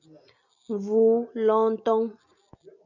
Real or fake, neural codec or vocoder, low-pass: real; none; 7.2 kHz